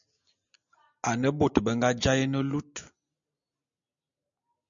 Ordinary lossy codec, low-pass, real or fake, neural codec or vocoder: MP3, 96 kbps; 7.2 kHz; real; none